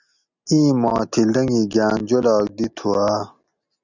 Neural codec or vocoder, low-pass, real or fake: none; 7.2 kHz; real